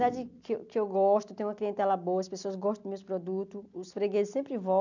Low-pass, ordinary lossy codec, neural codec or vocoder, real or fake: 7.2 kHz; none; none; real